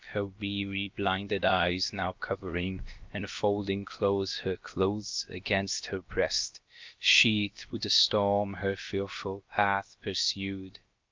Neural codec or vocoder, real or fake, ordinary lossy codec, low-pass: codec, 16 kHz, about 1 kbps, DyCAST, with the encoder's durations; fake; Opus, 24 kbps; 7.2 kHz